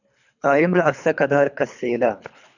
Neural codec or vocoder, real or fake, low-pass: codec, 24 kHz, 3 kbps, HILCodec; fake; 7.2 kHz